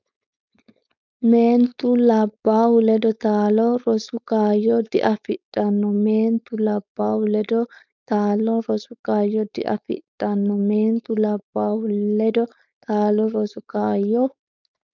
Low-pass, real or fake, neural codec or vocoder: 7.2 kHz; fake; codec, 16 kHz, 4.8 kbps, FACodec